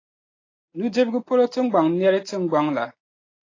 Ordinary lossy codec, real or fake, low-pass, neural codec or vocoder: AAC, 32 kbps; real; 7.2 kHz; none